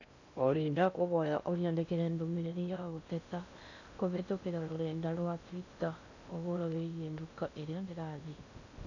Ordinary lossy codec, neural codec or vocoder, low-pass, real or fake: none; codec, 16 kHz in and 24 kHz out, 0.6 kbps, FocalCodec, streaming, 2048 codes; 7.2 kHz; fake